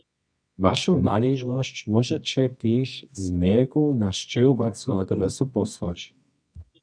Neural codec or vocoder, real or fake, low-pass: codec, 24 kHz, 0.9 kbps, WavTokenizer, medium music audio release; fake; 9.9 kHz